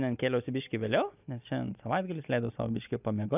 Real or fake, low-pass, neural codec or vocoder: real; 3.6 kHz; none